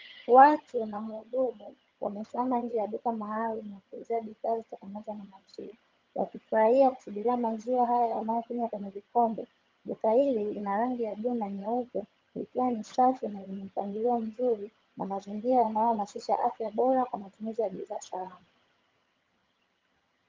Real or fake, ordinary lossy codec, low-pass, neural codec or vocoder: fake; Opus, 24 kbps; 7.2 kHz; vocoder, 22.05 kHz, 80 mel bands, HiFi-GAN